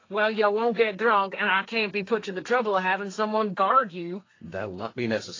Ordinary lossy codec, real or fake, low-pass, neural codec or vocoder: AAC, 32 kbps; fake; 7.2 kHz; codec, 32 kHz, 1.9 kbps, SNAC